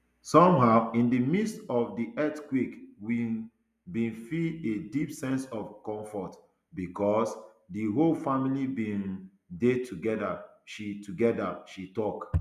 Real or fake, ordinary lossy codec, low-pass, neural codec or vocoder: real; none; 14.4 kHz; none